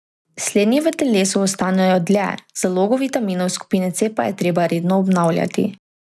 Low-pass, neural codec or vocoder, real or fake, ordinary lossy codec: none; none; real; none